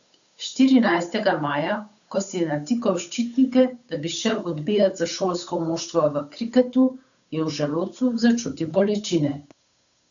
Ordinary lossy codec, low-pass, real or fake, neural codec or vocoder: none; 7.2 kHz; fake; codec, 16 kHz, 8 kbps, FunCodec, trained on Chinese and English, 25 frames a second